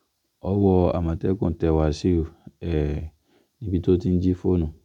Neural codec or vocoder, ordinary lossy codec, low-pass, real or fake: none; none; 19.8 kHz; real